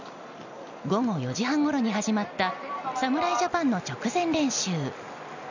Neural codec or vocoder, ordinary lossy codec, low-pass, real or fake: none; none; 7.2 kHz; real